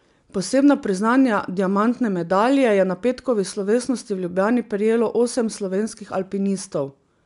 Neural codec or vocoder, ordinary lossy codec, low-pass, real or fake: none; none; 10.8 kHz; real